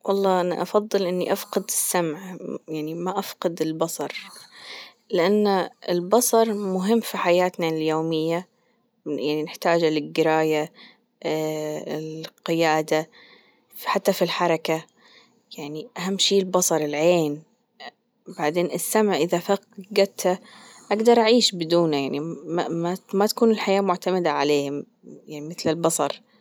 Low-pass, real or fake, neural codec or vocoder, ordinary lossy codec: none; real; none; none